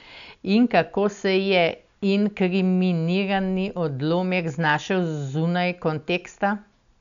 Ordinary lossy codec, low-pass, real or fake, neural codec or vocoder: none; 7.2 kHz; real; none